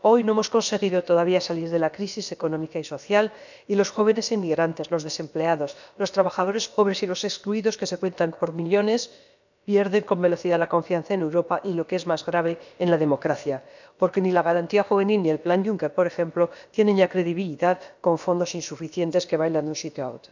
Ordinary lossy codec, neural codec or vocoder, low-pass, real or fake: none; codec, 16 kHz, about 1 kbps, DyCAST, with the encoder's durations; 7.2 kHz; fake